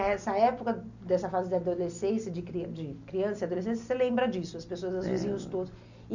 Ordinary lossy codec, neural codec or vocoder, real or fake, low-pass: none; none; real; 7.2 kHz